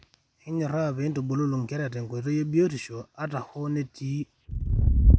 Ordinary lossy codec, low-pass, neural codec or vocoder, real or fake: none; none; none; real